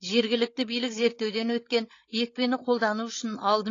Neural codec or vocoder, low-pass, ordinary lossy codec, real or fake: codec, 16 kHz, 16 kbps, FreqCodec, larger model; 7.2 kHz; AAC, 32 kbps; fake